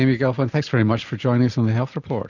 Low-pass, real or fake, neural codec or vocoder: 7.2 kHz; real; none